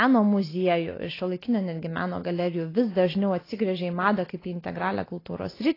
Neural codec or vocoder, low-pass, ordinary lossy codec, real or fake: none; 5.4 kHz; AAC, 24 kbps; real